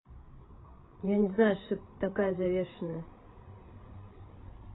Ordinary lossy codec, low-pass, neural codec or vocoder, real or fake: AAC, 16 kbps; 7.2 kHz; codec, 16 kHz, 8 kbps, FreqCodec, larger model; fake